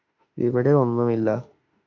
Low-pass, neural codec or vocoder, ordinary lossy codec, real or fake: 7.2 kHz; autoencoder, 48 kHz, 32 numbers a frame, DAC-VAE, trained on Japanese speech; AAC, 48 kbps; fake